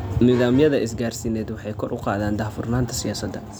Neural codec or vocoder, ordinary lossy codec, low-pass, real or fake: none; none; none; real